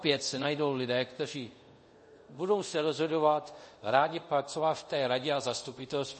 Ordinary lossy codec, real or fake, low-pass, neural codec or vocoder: MP3, 32 kbps; fake; 10.8 kHz; codec, 24 kHz, 0.5 kbps, DualCodec